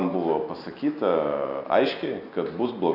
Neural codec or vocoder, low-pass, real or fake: none; 5.4 kHz; real